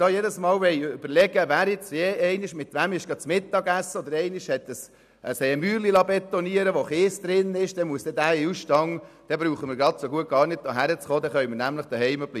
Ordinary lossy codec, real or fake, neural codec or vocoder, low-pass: none; real; none; 14.4 kHz